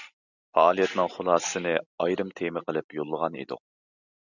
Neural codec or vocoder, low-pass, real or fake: none; 7.2 kHz; real